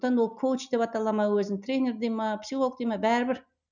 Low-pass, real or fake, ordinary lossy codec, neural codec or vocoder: 7.2 kHz; real; none; none